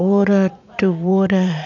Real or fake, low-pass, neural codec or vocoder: fake; 7.2 kHz; vocoder, 44.1 kHz, 80 mel bands, Vocos